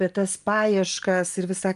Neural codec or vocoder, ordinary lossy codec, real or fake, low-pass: none; Opus, 32 kbps; real; 10.8 kHz